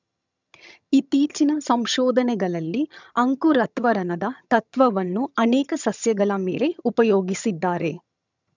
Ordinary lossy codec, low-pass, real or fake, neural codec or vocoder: none; 7.2 kHz; fake; vocoder, 22.05 kHz, 80 mel bands, HiFi-GAN